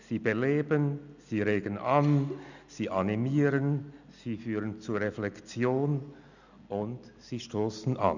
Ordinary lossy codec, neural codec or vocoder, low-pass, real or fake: none; none; 7.2 kHz; real